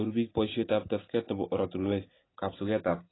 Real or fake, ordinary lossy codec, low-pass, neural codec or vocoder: real; AAC, 16 kbps; 7.2 kHz; none